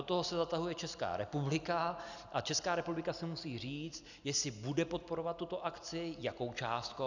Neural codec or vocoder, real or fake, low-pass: none; real; 7.2 kHz